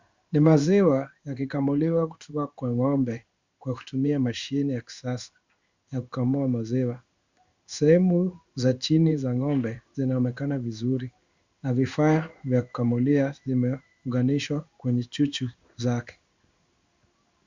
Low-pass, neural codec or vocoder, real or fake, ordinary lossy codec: 7.2 kHz; codec, 16 kHz in and 24 kHz out, 1 kbps, XY-Tokenizer; fake; Opus, 64 kbps